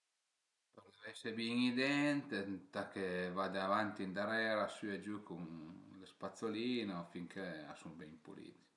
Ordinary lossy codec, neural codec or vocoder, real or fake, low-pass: none; none; real; 10.8 kHz